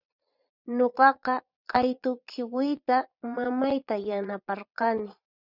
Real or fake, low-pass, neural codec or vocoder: fake; 5.4 kHz; vocoder, 22.05 kHz, 80 mel bands, Vocos